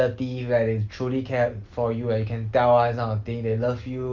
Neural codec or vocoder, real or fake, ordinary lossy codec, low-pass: none; real; Opus, 24 kbps; 7.2 kHz